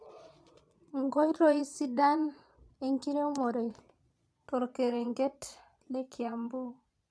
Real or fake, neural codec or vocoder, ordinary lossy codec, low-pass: fake; vocoder, 22.05 kHz, 80 mel bands, Vocos; none; none